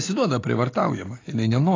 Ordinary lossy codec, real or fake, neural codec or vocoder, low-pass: AAC, 32 kbps; real; none; 7.2 kHz